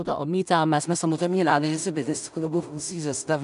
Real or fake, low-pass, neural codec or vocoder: fake; 10.8 kHz; codec, 16 kHz in and 24 kHz out, 0.4 kbps, LongCat-Audio-Codec, two codebook decoder